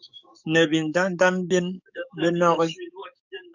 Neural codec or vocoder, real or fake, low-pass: codec, 44.1 kHz, 7.8 kbps, DAC; fake; 7.2 kHz